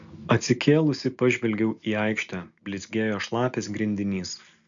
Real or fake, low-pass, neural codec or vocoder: real; 7.2 kHz; none